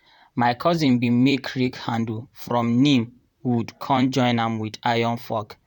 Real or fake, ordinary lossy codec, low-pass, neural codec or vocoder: fake; none; 19.8 kHz; vocoder, 44.1 kHz, 128 mel bands, Pupu-Vocoder